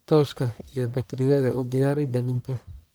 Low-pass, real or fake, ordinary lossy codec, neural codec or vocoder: none; fake; none; codec, 44.1 kHz, 1.7 kbps, Pupu-Codec